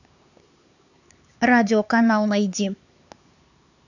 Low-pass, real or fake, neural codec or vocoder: 7.2 kHz; fake; codec, 16 kHz, 4 kbps, X-Codec, HuBERT features, trained on LibriSpeech